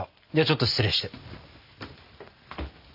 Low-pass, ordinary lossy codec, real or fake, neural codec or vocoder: 5.4 kHz; AAC, 48 kbps; real; none